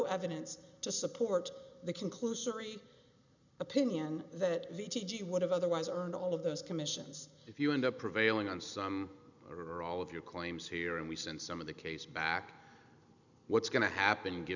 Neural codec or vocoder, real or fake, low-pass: none; real; 7.2 kHz